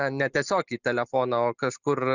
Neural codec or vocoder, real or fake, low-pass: none; real; 7.2 kHz